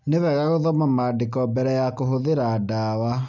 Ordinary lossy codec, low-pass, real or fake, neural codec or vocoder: none; 7.2 kHz; real; none